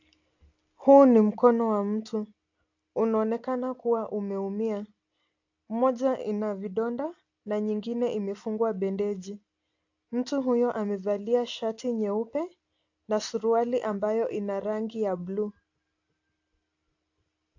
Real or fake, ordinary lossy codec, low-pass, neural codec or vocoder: real; AAC, 48 kbps; 7.2 kHz; none